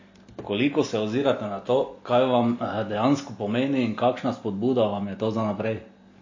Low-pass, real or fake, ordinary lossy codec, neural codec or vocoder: 7.2 kHz; real; MP3, 32 kbps; none